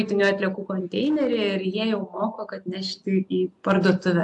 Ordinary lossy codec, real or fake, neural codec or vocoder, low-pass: AAC, 64 kbps; real; none; 10.8 kHz